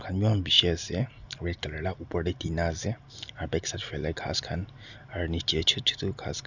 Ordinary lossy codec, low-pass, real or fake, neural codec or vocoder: none; 7.2 kHz; real; none